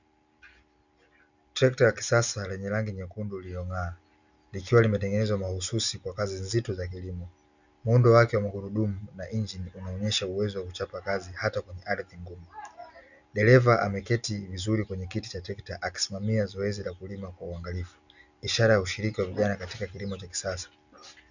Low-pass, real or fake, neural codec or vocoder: 7.2 kHz; real; none